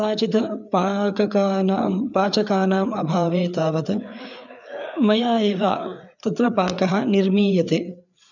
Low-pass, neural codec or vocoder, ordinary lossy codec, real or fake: 7.2 kHz; codec, 16 kHz, 4 kbps, FreqCodec, larger model; none; fake